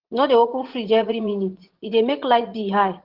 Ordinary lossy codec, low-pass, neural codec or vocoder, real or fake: Opus, 16 kbps; 5.4 kHz; vocoder, 44.1 kHz, 80 mel bands, Vocos; fake